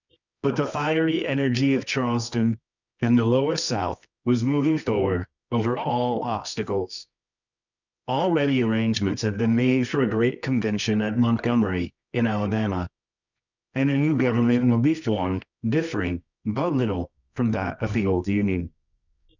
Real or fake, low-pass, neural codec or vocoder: fake; 7.2 kHz; codec, 24 kHz, 0.9 kbps, WavTokenizer, medium music audio release